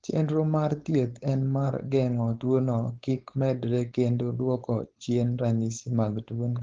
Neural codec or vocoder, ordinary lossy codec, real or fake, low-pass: codec, 16 kHz, 4.8 kbps, FACodec; Opus, 16 kbps; fake; 7.2 kHz